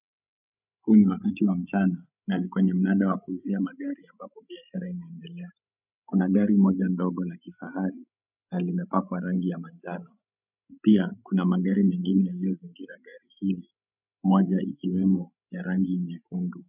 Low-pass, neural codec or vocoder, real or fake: 3.6 kHz; codec, 16 kHz, 16 kbps, FreqCodec, larger model; fake